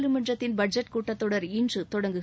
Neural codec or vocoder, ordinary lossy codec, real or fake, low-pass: none; none; real; none